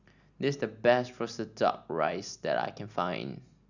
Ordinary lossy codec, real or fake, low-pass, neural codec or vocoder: none; real; 7.2 kHz; none